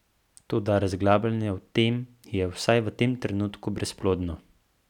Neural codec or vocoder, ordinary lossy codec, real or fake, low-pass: none; none; real; 19.8 kHz